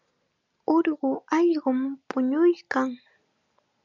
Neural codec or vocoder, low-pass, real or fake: none; 7.2 kHz; real